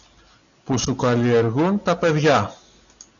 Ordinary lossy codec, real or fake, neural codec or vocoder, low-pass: MP3, 96 kbps; real; none; 7.2 kHz